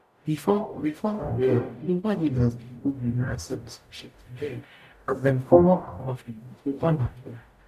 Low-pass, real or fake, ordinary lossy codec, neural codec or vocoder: 14.4 kHz; fake; none; codec, 44.1 kHz, 0.9 kbps, DAC